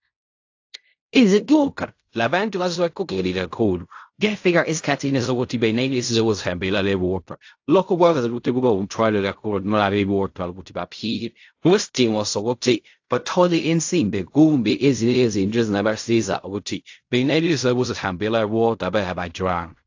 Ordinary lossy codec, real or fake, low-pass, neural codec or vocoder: AAC, 48 kbps; fake; 7.2 kHz; codec, 16 kHz in and 24 kHz out, 0.4 kbps, LongCat-Audio-Codec, fine tuned four codebook decoder